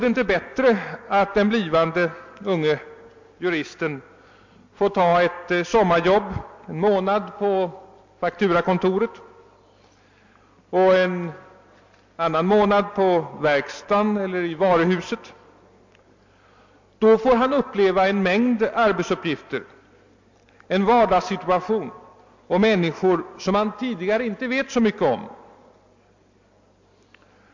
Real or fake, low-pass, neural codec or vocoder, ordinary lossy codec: real; 7.2 kHz; none; MP3, 48 kbps